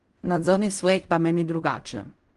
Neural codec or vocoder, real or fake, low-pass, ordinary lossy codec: codec, 16 kHz in and 24 kHz out, 0.4 kbps, LongCat-Audio-Codec, fine tuned four codebook decoder; fake; 10.8 kHz; Opus, 32 kbps